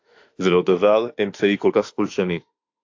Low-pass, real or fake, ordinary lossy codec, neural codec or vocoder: 7.2 kHz; fake; AAC, 48 kbps; autoencoder, 48 kHz, 32 numbers a frame, DAC-VAE, trained on Japanese speech